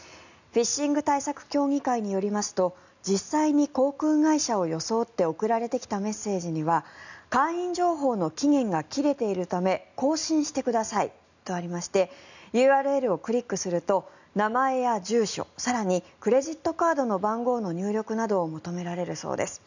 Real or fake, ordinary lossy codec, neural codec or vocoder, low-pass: real; none; none; 7.2 kHz